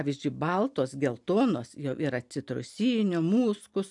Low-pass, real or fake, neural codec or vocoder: 10.8 kHz; real; none